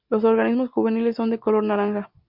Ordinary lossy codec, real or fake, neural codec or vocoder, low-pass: MP3, 48 kbps; real; none; 5.4 kHz